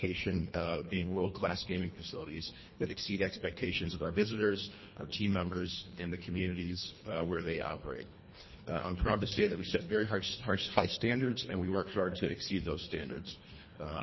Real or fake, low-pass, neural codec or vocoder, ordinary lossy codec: fake; 7.2 kHz; codec, 24 kHz, 1.5 kbps, HILCodec; MP3, 24 kbps